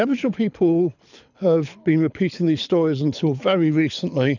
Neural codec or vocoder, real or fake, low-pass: codec, 24 kHz, 6 kbps, HILCodec; fake; 7.2 kHz